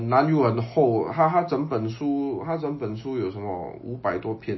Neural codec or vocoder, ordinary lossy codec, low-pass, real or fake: none; MP3, 24 kbps; 7.2 kHz; real